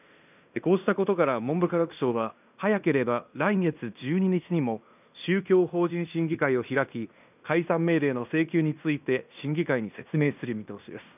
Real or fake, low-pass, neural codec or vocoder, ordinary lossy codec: fake; 3.6 kHz; codec, 16 kHz in and 24 kHz out, 0.9 kbps, LongCat-Audio-Codec, fine tuned four codebook decoder; none